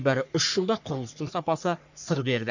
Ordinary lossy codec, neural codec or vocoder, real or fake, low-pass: none; codec, 44.1 kHz, 3.4 kbps, Pupu-Codec; fake; 7.2 kHz